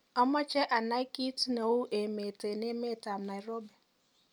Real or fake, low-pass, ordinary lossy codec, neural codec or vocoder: real; none; none; none